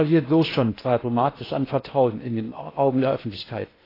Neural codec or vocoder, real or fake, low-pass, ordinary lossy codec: codec, 16 kHz in and 24 kHz out, 0.8 kbps, FocalCodec, streaming, 65536 codes; fake; 5.4 kHz; AAC, 24 kbps